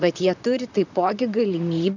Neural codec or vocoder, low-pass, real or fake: vocoder, 44.1 kHz, 128 mel bands, Pupu-Vocoder; 7.2 kHz; fake